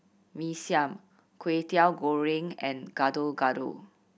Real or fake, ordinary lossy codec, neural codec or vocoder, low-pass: real; none; none; none